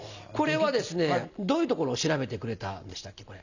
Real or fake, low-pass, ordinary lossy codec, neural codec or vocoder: real; 7.2 kHz; none; none